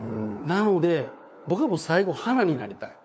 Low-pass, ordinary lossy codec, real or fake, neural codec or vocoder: none; none; fake; codec, 16 kHz, 4 kbps, FunCodec, trained on LibriTTS, 50 frames a second